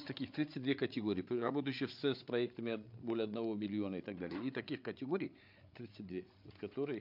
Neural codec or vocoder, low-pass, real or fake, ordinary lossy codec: codec, 16 kHz, 8 kbps, FreqCodec, larger model; 5.4 kHz; fake; MP3, 48 kbps